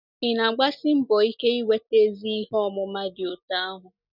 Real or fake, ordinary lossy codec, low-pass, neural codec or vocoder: real; none; 5.4 kHz; none